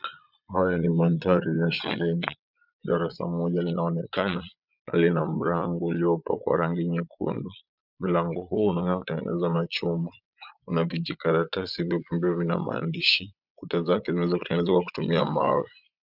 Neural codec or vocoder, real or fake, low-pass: vocoder, 22.05 kHz, 80 mel bands, Vocos; fake; 5.4 kHz